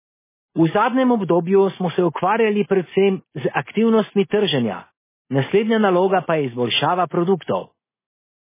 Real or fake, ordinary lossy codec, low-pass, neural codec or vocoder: real; MP3, 16 kbps; 3.6 kHz; none